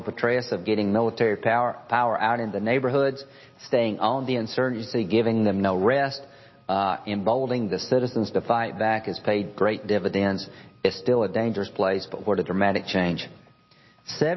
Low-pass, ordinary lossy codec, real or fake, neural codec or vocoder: 7.2 kHz; MP3, 24 kbps; real; none